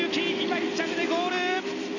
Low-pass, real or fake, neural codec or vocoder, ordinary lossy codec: 7.2 kHz; fake; vocoder, 44.1 kHz, 128 mel bands every 256 samples, BigVGAN v2; none